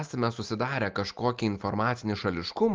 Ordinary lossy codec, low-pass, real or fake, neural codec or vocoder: Opus, 32 kbps; 7.2 kHz; real; none